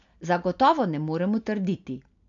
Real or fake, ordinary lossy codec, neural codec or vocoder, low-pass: real; none; none; 7.2 kHz